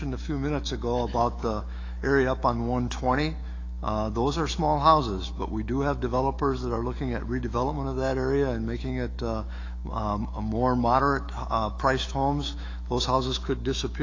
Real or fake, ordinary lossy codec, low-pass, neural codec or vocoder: fake; AAC, 32 kbps; 7.2 kHz; autoencoder, 48 kHz, 128 numbers a frame, DAC-VAE, trained on Japanese speech